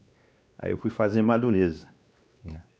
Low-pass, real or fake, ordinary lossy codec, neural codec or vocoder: none; fake; none; codec, 16 kHz, 2 kbps, X-Codec, WavLM features, trained on Multilingual LibriSpeech